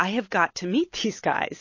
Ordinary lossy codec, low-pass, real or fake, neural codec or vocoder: MP3, 32 kbps; 7.2 kHz; real; none